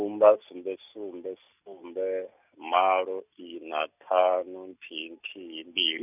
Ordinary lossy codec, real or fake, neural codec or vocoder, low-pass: none; real; none; 3.6 kHz